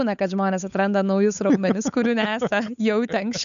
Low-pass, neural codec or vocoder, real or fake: 7.2 kHz; codec, 16 kHz, 8 kbps, FunCodec, trained on Chinese and English, 25 frames a second; fake